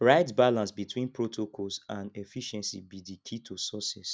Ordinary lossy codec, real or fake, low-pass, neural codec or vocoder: none; real; none; none